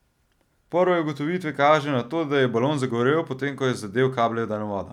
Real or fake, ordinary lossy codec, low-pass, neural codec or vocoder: real; none; 19.8 kHz; none